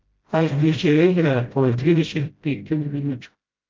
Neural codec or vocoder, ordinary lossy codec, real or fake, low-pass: codec, 16 kHz, 0.5 kbps, FreqCodec, smaller model; Opus, 24 kbps; fake; 7.2 kHz